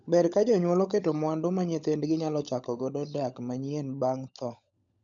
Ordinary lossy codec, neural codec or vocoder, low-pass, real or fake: none; codec, 16 kHz, 16 kbps, FunCodec, trained on LibriTTS, 50 frames a second; 7.2 kHz; fake